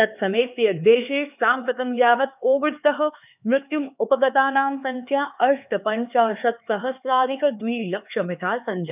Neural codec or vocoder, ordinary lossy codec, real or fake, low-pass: codec, 16 kHz, 4 kbps, X-Codec, HuBERT features, trained on LibriSpeech; none; fake; 3.6 kHz